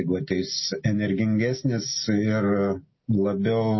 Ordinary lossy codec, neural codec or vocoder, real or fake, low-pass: MP3, 24 kbps; none; real; 7.2 kHz